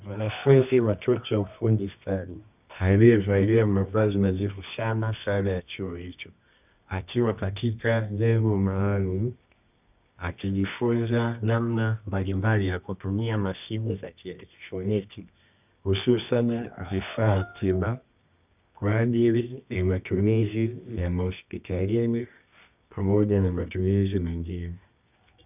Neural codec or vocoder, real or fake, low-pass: codec, 24 kHz, 0.9 kbps, WavTokenizer, medium music audio release; fake; 3.6 kHz